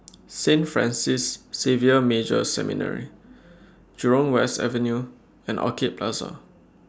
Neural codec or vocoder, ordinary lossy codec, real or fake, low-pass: none; none; real; none